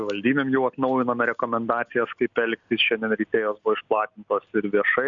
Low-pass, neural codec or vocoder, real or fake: 7.2 kHz; none; real